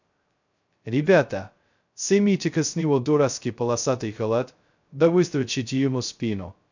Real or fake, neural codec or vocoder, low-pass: fake; codec, 16 kHz, 0.2 kbps, FocalCodec; 7.2 kHz